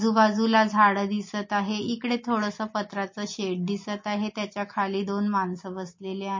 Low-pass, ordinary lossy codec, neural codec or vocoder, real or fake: 7.2 kHz; MP3, 32 kbps; none; real